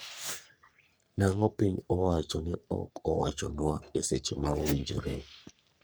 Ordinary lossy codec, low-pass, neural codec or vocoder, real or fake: none; none; codec, 44.1 kHz, 3.4 kbps, Pupu-Codec; fake